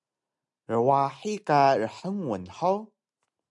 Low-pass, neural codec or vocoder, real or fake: 10.8 kHz; none; real